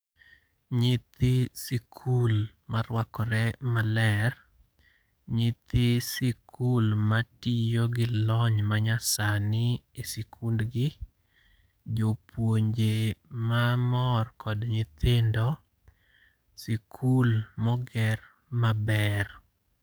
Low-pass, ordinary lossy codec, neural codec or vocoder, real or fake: none; none; codec, 44.1 kHz, 7.8 kbps, DAC; fake